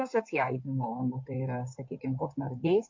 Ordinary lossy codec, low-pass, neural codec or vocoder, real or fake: MP3, 48 kbps; 7.2 kHz; codec, 16 kHz in and 24 kHz out, 2.2 kbps, FireRedTTS-2 codec; fake